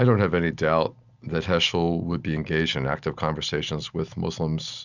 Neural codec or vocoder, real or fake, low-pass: none; real; 7.2 kHz